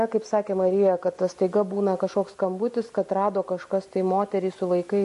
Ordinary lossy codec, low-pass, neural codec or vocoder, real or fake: MP3, 48 kbps; 14.4 kHz; none; real